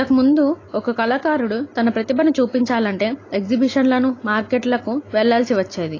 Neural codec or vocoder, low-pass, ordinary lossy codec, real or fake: none; 7.2 kHz; AAC, 32 kbps; real